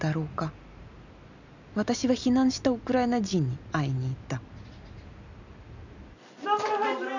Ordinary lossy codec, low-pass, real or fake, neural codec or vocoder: none; 7.2 kHz; real; none